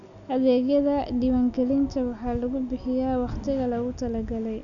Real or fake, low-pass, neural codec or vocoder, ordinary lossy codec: real; 7.2 kHz; none; none